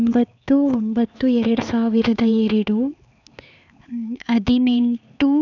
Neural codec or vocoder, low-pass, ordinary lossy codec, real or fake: codec, 16 kHz, 4 kbps, X-Codec, HuBERT features, trained on LibriSpeech; 7.2 kHz; none; fake